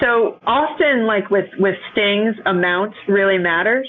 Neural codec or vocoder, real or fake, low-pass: none; real; 7.2 kHz